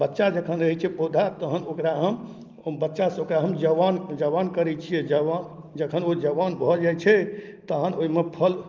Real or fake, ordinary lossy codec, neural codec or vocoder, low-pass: real; Opus, 24 kbps; none; 7.2 kHz